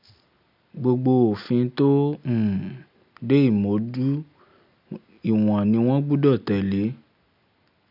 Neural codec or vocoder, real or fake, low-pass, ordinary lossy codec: none; real; 5.4 kHz; none